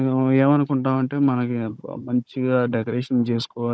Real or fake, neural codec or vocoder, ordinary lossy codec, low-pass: fake; codec, 16 kHz, 16 kbps, FunCodec, trained on Chinese and English, 50 frames a second; none; none